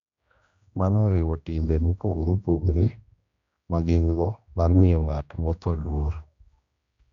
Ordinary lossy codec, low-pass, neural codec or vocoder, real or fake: Opus, 64 kbps; 7.2 kHz; codec, 16 kHz, 1 kbps, X-Codec, HuBERT features, trained on general audio; fake